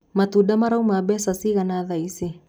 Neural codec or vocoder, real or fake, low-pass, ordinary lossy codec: none; real; none; none